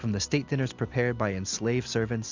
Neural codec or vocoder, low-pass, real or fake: none; 7.2 kHz; real